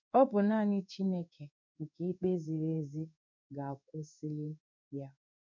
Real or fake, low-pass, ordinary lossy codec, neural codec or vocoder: fake; 7.2 kHz; MP3, 64 kbps; codec, 16 kHz in and 24 kHz out, 1 kbps, XY-Tokenizer